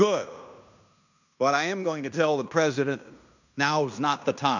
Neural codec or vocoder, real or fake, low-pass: codec, 16 kHz in and 24 kHz out, 0.9 kbps, LongCat-Audio-Codec, fine tuned four codebook decoder; fake; 7.2 kHz